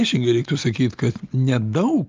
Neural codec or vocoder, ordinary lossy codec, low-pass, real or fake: none; Opus, 32 kbps; 7.2 kHz; real